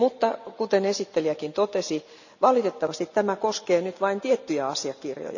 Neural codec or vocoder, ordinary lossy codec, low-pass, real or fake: none; none; 7.2 kHz; real